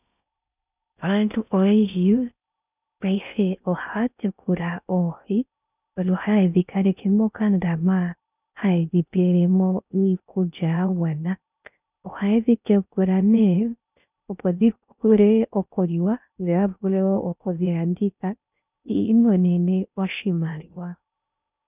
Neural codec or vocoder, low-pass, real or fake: codec, 16 kHz in and 24 kHz out, 0.6 kbps, FocalCodec, streaming, 4096 codes; 3.6 kHz; fake